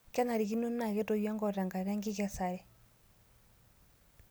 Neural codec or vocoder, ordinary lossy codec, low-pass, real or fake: none; none; none; real